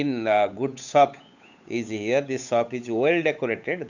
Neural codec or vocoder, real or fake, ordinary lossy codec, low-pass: codec, 16 kHz, 8 kbps, FunCodec, trained on Chinese and English, 25 frames a second; fake; none; 7.2 kHz